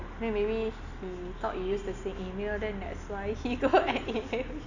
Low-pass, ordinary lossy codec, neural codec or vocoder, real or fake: 7.2 kHz; none; none; real